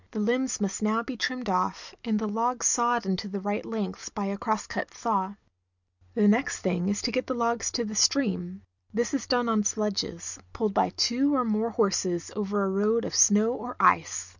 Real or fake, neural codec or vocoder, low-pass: real; none; 7.2 kHz